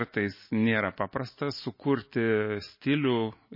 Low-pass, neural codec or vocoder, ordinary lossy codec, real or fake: 5.4 kHz; none; MP3, 24 kbps; real